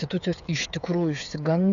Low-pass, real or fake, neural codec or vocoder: 7.2 kHz; fake; codec, 16 kHz, 8 kbps, FreqCodec, smaller model